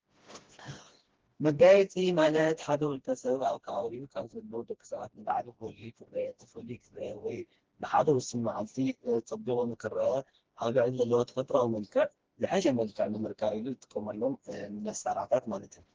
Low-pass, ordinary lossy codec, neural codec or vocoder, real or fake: 7.2 kHz; Opus, 16 kbps; codec, 16 kHz, 1 kbps, FreqCodec, smaller model; fake